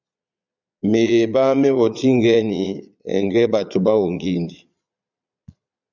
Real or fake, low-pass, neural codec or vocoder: fake; 7.2 kHz; vocoder, 22.05 kHz, 80 mel bands, Vocos